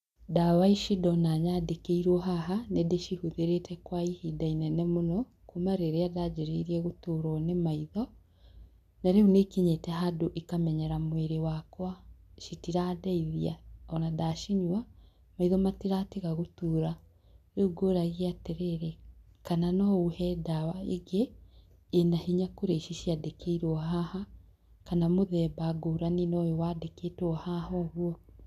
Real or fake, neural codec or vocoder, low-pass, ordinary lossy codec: real; none; 14.4 kHz; none